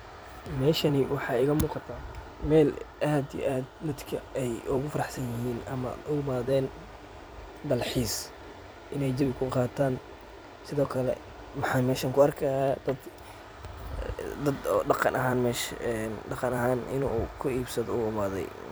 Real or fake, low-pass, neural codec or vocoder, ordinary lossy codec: fake; none; vocoder, 44.1 kHz, 128 mel bands every 256 samples, BigVGAN v2; none